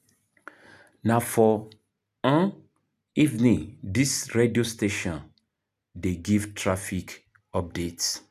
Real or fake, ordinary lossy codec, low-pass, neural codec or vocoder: real; none; 14.4 kHz; none